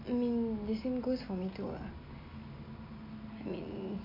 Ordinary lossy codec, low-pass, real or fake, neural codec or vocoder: MP3, 48 kbps; 5.4 kHz; real; none